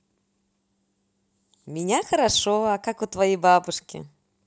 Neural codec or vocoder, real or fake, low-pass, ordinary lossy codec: none; real; none; none